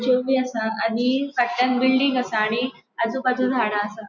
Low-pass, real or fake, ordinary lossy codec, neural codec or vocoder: 7.2 kHz; real; none; none